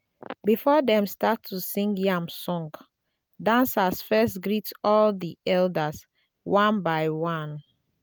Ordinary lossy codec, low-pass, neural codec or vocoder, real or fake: none; none; none; real